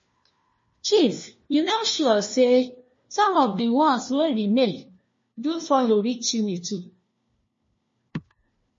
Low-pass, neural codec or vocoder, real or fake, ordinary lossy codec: 7.2 kHz; codec, 16 kHz, 1 kbps, FunCodec, trained on LibriTTS, 50 frames a second; fake; MP3, 32 kbps